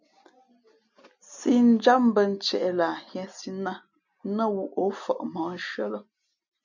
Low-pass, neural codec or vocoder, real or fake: 7.2 kHz; none; real